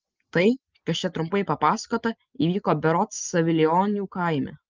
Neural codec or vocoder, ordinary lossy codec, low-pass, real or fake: none; Opus, 32 kbps; 7.2 kHz; real